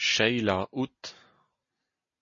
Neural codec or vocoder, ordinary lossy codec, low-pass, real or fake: none; MP3, 32 kbps; 7.2 kHz; real